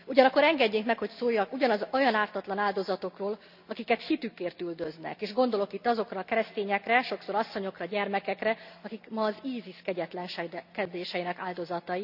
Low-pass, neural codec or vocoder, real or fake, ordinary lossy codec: 5.4 kHz; none; real; none